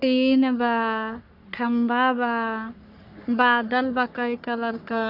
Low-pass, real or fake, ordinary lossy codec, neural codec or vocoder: 5.4 kHz; fake; none; codec, 44.1 kHz, 3.4 kbps, Pupu-Codec